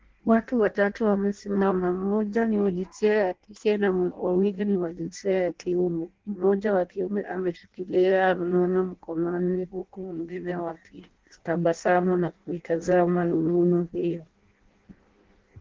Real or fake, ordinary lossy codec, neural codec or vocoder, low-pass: fake; Opus, 16 kbps; codec, 16 kHz in and 24 kHz out, 0.6 kbps, FireRedTTS-2 codec; 7.2 kHz